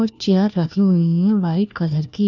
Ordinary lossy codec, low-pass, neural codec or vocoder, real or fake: none; 7.2 kHz; codec, 16 kHz, 1 kbps, FunCodec, trained on LibriTTS, 50 frames a second; fake